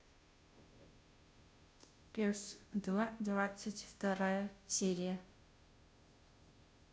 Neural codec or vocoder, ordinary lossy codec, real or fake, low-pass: codec, 16 kHz, 0.5 kbps, FunCodec, trained on Chinese and English, 25 frames a second; none; fake; none